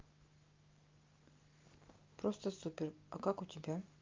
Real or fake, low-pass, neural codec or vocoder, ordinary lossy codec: real; 7.2 kHz; none; Opus, 24 kbps